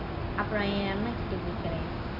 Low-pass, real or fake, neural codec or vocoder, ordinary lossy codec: 5.4 kHz; real; none; AAC, 48 kbps